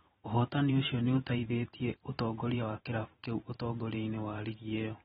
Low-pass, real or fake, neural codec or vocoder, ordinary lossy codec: 19.8 kHz; fake; vocoder, 44.1 kHz, 128 mel bands every 512 samples, BigVGAN v2; AAC, 16 kbps